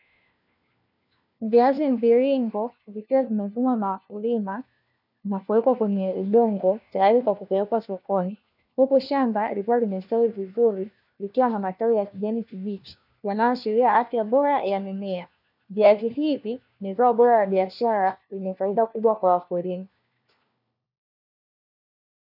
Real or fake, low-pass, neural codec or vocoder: fake; 5.4 kHz; codec, 16 kHz, 1 kbps, FunCodec, trained on LibriTTS, 50 frames a second